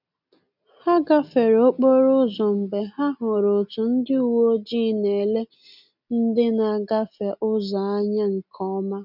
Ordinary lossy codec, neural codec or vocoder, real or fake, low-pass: none; none; real; 5.4 kHz